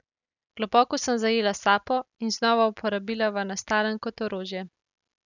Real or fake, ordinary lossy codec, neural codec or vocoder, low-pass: real; none; none; 7.2 kHz